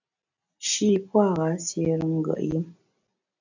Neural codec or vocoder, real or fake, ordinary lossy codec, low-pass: none; real; AAC, 48 kbps; 7.2 kHz